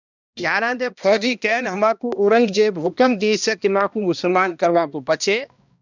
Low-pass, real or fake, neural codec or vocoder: 7.2 kHz; fake; codec, 16 kHz, 1 kbps, X-Codec, HuBERT features, trained on balanced general audio